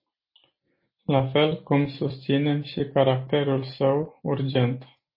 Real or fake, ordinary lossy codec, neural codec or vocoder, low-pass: real; MP3, 24 kbps; none; 5.4 kHz